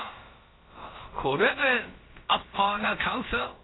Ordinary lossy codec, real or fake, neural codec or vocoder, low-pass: AAC, 16 kbps; fake; codec, 16 kHz, about 1 kbps, DyCAST, with the encoder's durations; 7.2 kHz